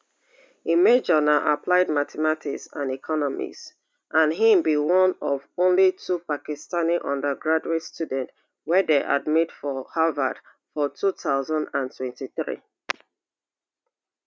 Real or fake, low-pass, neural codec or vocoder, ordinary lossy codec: real; none; none; none